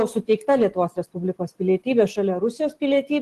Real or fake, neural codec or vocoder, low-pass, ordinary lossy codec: real; none; 14.4 kHz; Opus, 16 kbps